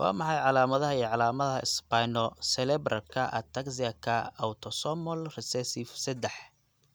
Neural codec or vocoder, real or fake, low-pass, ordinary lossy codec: none; real; none; none